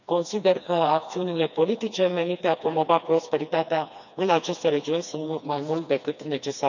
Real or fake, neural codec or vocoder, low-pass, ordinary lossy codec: fake; codec, 16 kHz, 2 kbps, FreqCodec, smaller model; 7.2 kHz; none